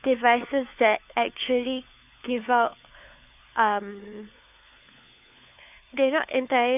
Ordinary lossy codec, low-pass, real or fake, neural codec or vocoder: none; 3.6 kHz; fake; codec, 16 kHz, 4 kbps, FunCodec, trained on LibriTTS, 50 frames a second